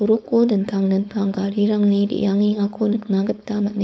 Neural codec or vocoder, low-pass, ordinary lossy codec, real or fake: codec, 16 kHz, 4.8 kbps, FACodec; none; none; fake